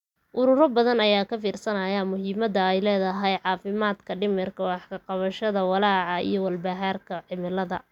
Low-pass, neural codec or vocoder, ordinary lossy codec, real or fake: 19.8 kHz; none; none; real